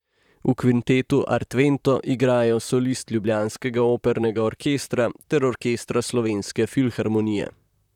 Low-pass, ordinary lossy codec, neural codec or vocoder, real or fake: 19.8 kHz; none; vocoder, 44.1 kHz, 128 mel bands, Pupu-Vocoder; fake